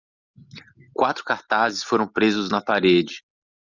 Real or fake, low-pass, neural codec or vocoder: real; 7.2 kHz; none